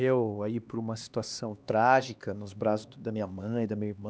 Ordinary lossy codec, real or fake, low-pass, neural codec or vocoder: none; fake; none; codec, 16 kHz, 2 kbps, X-Codec, HuBERT features, trained on LibriSpeech